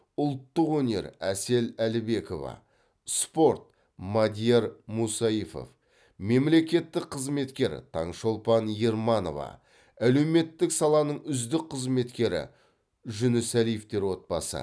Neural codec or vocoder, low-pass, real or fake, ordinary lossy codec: none; none; real; none